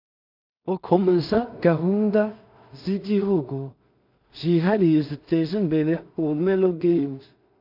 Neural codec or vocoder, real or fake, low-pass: codec, 16 kHz in and 24 kHz out, 0.4 kbps, LongCat-Audio-Codec, two codebook decoder; fake; 5.4 kHz